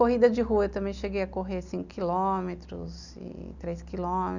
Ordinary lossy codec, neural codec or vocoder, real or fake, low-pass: none; none; real; 7.2 kHz